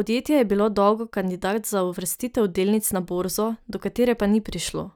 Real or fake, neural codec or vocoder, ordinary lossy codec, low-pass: real; none; none; none